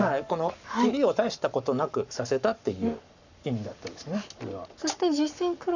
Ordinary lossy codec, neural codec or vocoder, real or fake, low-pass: none; codec, 44.1 kHz, 7.8 kbps, Pupu-Codec; fake; 7.2 kHz